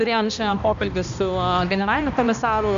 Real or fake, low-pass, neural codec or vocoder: fake; 7.2 kHz; codec, 16 kHz, 1 kbps, X-Codec, HuBERT features, trained on balanced general audio